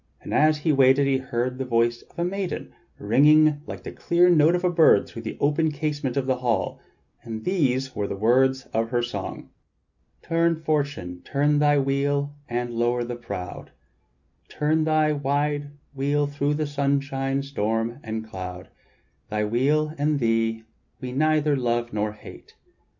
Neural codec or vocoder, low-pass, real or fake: none; 7.2 kHz; real